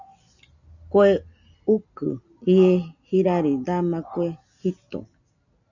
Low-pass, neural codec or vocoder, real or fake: 7.2 kHz; none; real